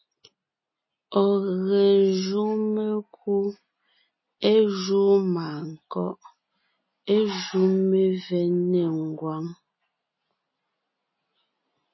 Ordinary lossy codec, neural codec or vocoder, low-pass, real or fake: MP3, 24 kbps; none; 7.2 kHz; real